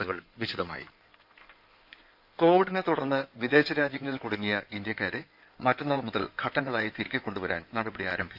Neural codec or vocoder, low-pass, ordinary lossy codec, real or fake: codec, 16 kHz in and 24 kHz out, 2.2 kbps, FireRedTTS-2 codec; 5.4 kHz; none; fake